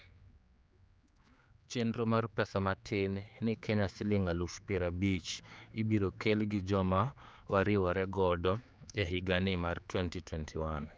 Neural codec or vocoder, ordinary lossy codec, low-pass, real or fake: codec, 16 kHz, 4 kbps, X-Codec, HuBERT features, trained on general audio; none; none; fake